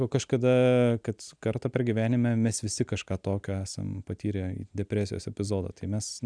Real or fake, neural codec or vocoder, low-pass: real; none; 9.9 kHz